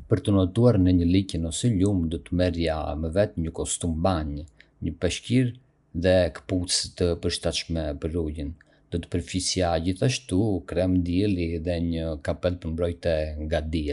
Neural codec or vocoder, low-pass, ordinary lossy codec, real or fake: none; 10.8 kHz; none; real